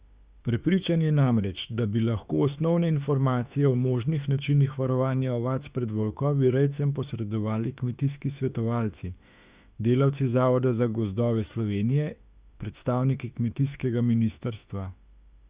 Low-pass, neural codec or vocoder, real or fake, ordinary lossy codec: 3.6 kHz; autoencoder, 48 kHz, 32 numbers a frame, DAC-VAE, trained on Japanese speech; fake; Opus, 64 kbps